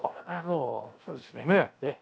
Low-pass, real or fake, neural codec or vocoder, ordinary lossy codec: none; fake; codec, 16 kHz, 0.7 kbps, FocalCodec; none